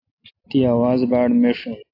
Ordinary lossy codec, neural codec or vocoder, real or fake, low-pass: AAC, 32 kbps; none; real; 5.4 kHz